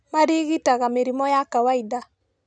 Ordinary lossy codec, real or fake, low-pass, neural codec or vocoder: none; real; 9.9 kHz; none